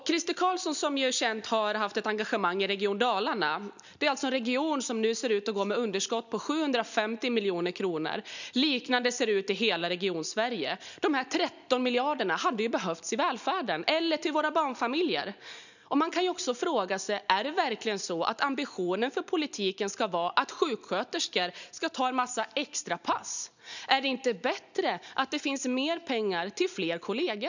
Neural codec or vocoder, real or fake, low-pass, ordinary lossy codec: none; real; 7.2 kHz; none